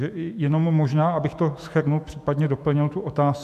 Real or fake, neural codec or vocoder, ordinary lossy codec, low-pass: fake; autoencoder, 48 kHz, 128 numbers a frame, DAC-VAE, trained on Japanese speech; AAC, 96 kbps; 14.4 kHz